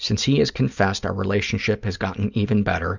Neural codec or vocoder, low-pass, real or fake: none; 7.2 kHz; real